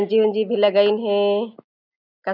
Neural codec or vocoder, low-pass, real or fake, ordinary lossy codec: none; 5.4 kHz; real; none